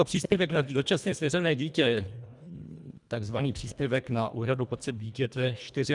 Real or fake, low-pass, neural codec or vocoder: fake; 10.8 kHz; codec, 24 kHz, 1.5 kbps, HILCodec